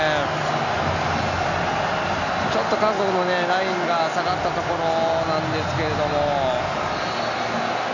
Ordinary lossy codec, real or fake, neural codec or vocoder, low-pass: none; real; none; 7.2 kHz